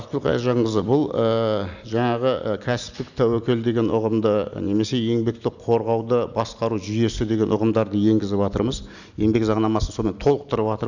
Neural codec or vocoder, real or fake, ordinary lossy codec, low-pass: none; real; none; 7.2 kHz